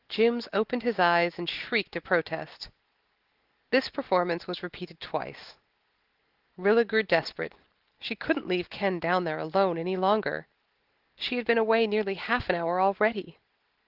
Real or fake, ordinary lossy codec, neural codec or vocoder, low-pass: real; Opus, 16 kbps; none; 5.4 kHz